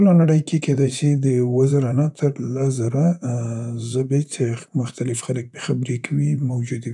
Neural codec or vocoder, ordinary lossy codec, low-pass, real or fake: vocoder, 44.1 kHz, 128 mel bands, Pupu-Vocoder; none; 10.8 kHz; fake